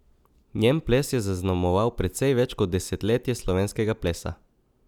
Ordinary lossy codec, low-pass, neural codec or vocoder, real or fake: none; 19.8 kHz; none; real